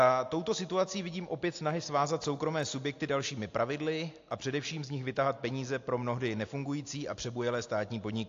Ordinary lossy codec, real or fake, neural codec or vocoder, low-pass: AAC, 48 kbps; real; none; 7.2 kHz